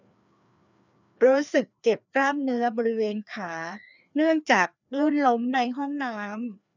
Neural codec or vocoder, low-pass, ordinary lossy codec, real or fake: codec, 16 kHz, 2 kbps, FreqCodec, larger model; 7.2 kHz; none; fake